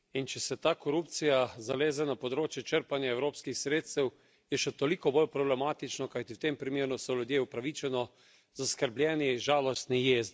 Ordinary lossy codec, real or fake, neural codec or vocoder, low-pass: none; real; none; none